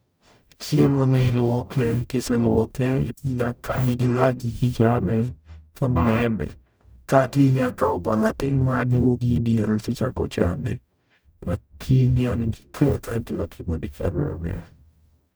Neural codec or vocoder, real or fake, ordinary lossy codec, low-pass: codec, 44.1 kHz, 0.9 kbps, DAC; fake; none; none